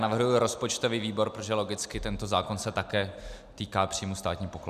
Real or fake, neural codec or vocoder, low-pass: real; none; 14.4 kHz